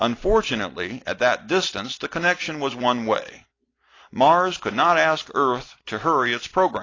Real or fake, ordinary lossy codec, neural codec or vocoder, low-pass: real; AAC, 32 kbps; none; 7.2 kHz